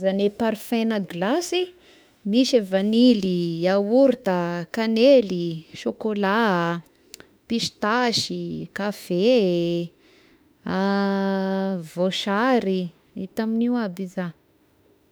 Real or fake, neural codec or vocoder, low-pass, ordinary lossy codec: fake; autoencoder, 48 kHz, 32 numbers a frame, DAC-VAE, trained on Japanese speech; none; none